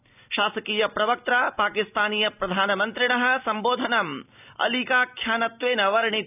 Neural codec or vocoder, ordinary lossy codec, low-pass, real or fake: none; none; 3.6 kHz; real